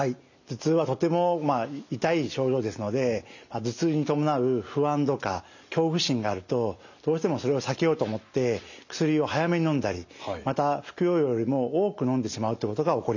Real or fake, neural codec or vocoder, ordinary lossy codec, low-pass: real; none; none; 7.2 kHz